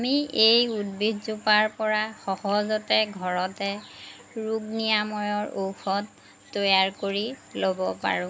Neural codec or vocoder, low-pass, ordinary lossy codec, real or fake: none; none; none; real